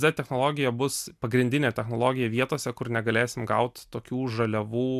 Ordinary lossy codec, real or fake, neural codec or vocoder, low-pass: MP3, 96 kbps; real; none; 14.4 kHz